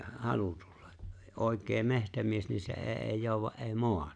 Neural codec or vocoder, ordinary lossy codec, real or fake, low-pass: none; none; real; 9.9 kHz